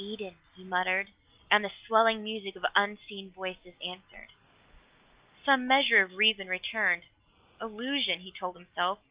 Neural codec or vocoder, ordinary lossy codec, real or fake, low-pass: none; Opus, 32 kbps; real; 3.6 kHz